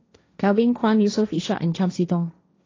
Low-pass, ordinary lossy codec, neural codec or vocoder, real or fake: 7.2 kHz; AAC, 32 kbps; codec, 16 kHz, 1.1 kbps, Voila-Tokenizer; fake